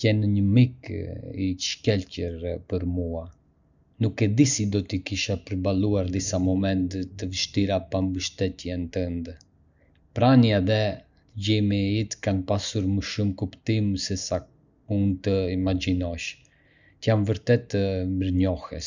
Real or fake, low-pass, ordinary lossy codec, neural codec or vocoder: real; 7.2 kHz; none; none